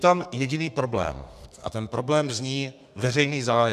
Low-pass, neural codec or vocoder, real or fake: 14.4 kHz; codec, 32 kHz, 1.9 kbps, SNAC; fake